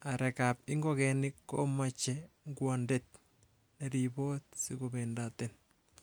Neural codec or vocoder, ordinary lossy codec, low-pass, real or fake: none; none; none; real